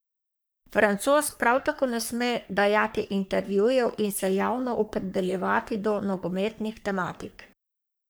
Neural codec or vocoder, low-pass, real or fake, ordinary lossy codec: codec, 44.1 kHz, 3.4 kbps, Pupu-Codec; none; fake; none